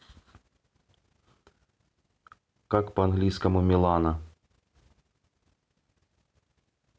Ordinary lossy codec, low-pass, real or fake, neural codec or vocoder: none; none; real; none